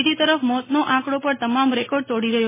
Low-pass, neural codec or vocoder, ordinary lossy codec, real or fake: 3.6 kHz; none; MP3, 16 kbps; real